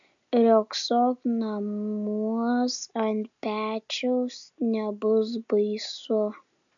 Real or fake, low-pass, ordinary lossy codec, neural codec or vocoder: real; 7.2 kHz; MP3, 96 kbps; none